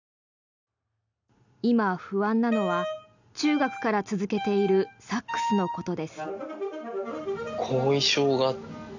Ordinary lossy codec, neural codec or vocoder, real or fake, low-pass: none; none; real; 7.2 kHz